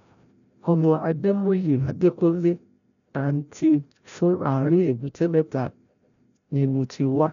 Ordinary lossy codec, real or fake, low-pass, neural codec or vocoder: none; fake; 7.2 kHz; codec, 16 kHz, 0.5 kbps, FreqCodec, larger model